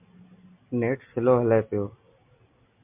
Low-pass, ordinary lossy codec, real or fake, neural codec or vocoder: 3.6 kHz; MP3, 32 kbps; real; none